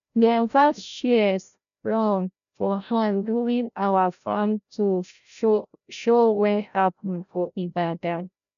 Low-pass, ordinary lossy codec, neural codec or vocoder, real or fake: 7.2 kHz; none; codec, 16 kHz, 0.5 kbps, FreqCodec, larger model; fake